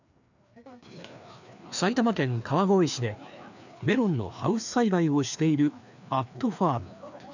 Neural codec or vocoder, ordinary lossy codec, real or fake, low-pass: codec, 16 kHz, 2 kbps, FreqCodec, larger model; none; fake; 7.2 kHz